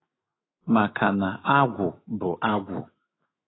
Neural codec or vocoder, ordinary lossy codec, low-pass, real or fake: autoencoder, 48 kHz, 128 numbers a frame, DAC-VAE, trained on Japanese speech; AAC, 16 kbps; 7.2 kHz; fake